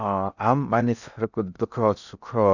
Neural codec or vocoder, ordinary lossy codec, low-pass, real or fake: codec, 16 kHz in and 24 kHz out, 0.6 kbps, FocalCodec, streaming, 4096 codes; none; 7.2 kHz; fake